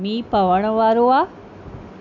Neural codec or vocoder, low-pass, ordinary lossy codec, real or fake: none; 7.2 kHz; none; real